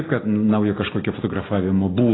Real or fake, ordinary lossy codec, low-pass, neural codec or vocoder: real; AAC, 16 kbps; 7.2 kHz; none